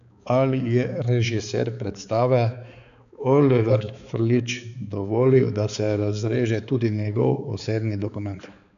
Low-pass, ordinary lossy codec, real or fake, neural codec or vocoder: 7.2 kHz; none; fake; codec, 16 kHz, 4 kbps, X-Codec, HuBERT features, trained on balanced general audio